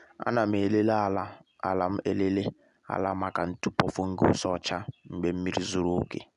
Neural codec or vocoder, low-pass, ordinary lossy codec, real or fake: none; 10.8 kHz; none; real